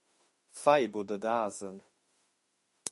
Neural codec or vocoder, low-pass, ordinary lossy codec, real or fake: autoencoder, 48 kHz, 32 numbers a frame, DAC-VAE, trained on Japanese speech; 14.4 kHz; MP3, 48 kbps; fake